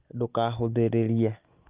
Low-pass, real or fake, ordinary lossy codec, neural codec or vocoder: 3.6 kHz; real; Opus, 24 kbps; none